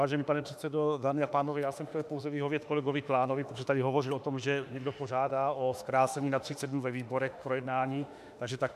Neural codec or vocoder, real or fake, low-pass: autoencoder, 48 kHz, 32 numbers a frame, DAC-VAE, trained on Japanese speech; fake; 14.4 kHz